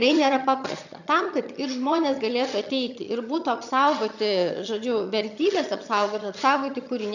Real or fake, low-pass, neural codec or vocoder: fake; 7.2 kHz; vocoder, 22.05 kHz, 80 mel bands, HiFi-GAN